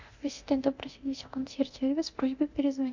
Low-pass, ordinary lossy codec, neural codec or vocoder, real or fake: 7.2 kHz; MP3, 64 kbps; codec, 24 kHz, 0.9 kbps, DualCodec; fake